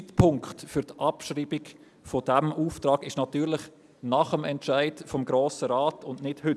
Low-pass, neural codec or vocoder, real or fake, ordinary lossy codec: none; none; real; none